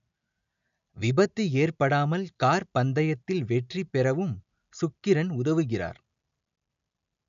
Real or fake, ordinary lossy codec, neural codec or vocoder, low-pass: real; none; none; 7.2 kHz